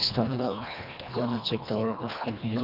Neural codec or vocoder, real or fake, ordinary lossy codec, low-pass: codec, 24 kHz, 1.5 kbps, HILCodec; fake; none; 5.4 kHz